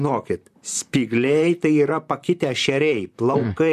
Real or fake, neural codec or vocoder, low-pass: fake; vocoder, 44.1 kHz, 128 mel bands, Pupu-Vocoder; 14.4 kHz